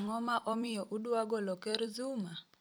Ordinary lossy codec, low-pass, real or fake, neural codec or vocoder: none; none; fake; vocoder, 44.1 kHz, 128 mel bands every 256 samples, BigVGAN v2